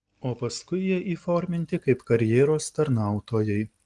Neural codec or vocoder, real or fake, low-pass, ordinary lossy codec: none; real; 10.8 kHz; Opus, 24 kbps